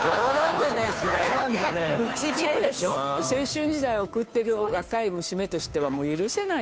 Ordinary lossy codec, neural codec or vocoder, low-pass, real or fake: none; codec, 16 kHz, 2 kbps, FunCodec, trained on Chinese and English, 25 frames a second; none; fake